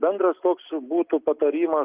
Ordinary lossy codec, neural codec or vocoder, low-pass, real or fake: Opus, 32 kbps; none; 3.6 kHz; real